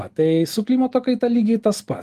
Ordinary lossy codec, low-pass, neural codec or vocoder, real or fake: Opus, 24 kbps; 14.4 kHz; none; real